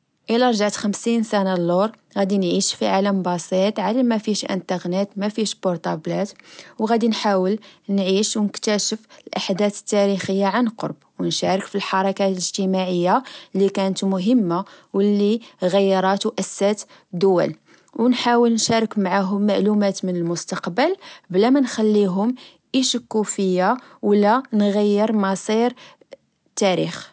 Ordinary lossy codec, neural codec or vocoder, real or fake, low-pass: none; none; real; none